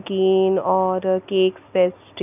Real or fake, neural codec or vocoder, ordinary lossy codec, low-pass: real; none; none; 3.6 kHz